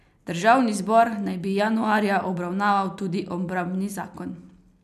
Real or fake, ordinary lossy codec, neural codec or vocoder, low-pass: real; none; none; 14.4 kHz